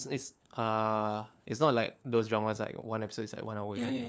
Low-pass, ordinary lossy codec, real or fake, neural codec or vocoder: none; none; fake; codec, 16 kHz, 4 kbps, FunCodec, trained on LibriTTS, 50 frames a second